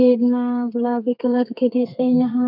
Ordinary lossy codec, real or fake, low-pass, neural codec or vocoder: none; fake; 5.4 kHz; codec, 32 kHz, 1.9 kbps, SNAC